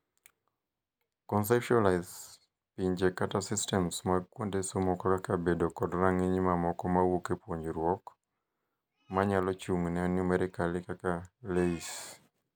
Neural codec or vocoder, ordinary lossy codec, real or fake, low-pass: none; none; real; none